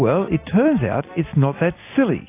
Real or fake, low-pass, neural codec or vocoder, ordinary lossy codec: real; 3.6 kHz; none; AAC, 24 kbps